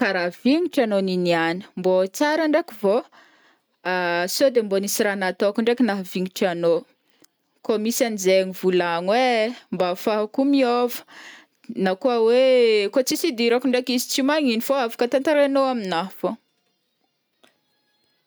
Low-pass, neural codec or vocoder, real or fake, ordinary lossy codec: none; none; real; none